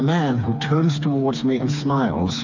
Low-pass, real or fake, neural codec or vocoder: 7.2 kHz; fake; codec, 16 kHz, 4 kbps, FreqCodec, smaller model